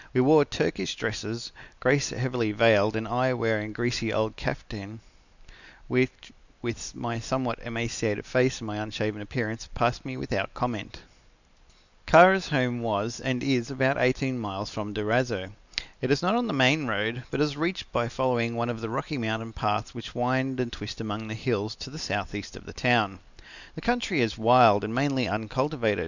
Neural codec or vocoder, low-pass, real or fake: none; 7.2 kHz; real